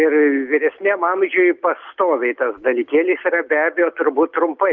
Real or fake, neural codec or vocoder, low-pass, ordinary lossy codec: real; none; 7.2 kHz; Opus, 24 kbps